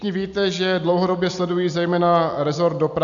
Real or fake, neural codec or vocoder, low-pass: real; none; 7.2 kHz